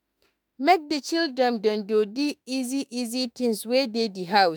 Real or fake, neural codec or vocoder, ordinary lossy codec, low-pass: fake; autoencoder, 48 kHz, 32 numbers a frame, DAC-VAE, trained on Japanese speech; none; none